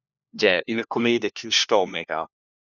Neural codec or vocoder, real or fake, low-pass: codec, 16 kHz, 1 kbps, FunCodec, trained on LibriTTS, 50 frames a second; fake; 7.2 kHz